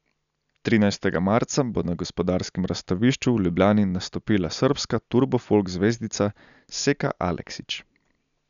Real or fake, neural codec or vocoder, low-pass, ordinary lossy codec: real; none; 7.2 kHz; none